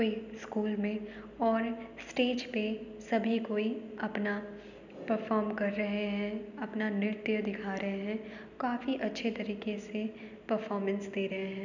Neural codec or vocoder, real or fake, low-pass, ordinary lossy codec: none; real; 7.2 kHz; none